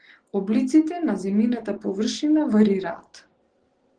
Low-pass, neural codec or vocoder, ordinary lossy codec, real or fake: 9.9 kHz; none; Opus, 16 kbps; real